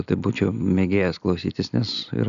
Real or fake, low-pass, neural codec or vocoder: real; 7.2 kHz; none